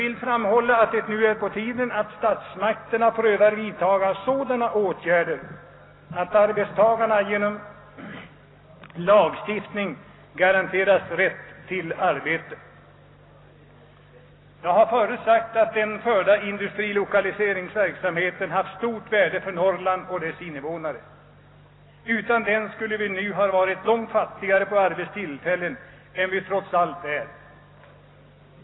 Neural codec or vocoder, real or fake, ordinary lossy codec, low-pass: none; real; AAC, 16 kbps; 7.2 kHz